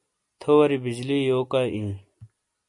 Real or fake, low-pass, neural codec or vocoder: real; 10.8 kHz; none